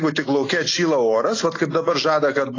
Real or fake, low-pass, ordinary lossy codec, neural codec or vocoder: real; 7.2 kHz; AAC, 32 kbps; none